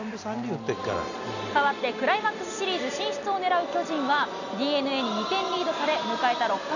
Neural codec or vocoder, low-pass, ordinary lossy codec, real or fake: none; 7.2 kHz; none; real